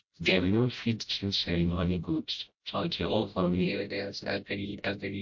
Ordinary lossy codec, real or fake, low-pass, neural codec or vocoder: MP3, 48 kbps; fake; 7.2 kHz; codec, 16 kHz, 0.5 kbps, FreqCodec, smaller model